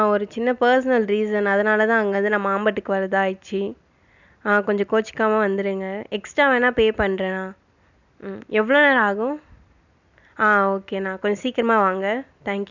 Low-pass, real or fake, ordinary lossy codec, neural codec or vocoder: 7.2 kHz; real; none; none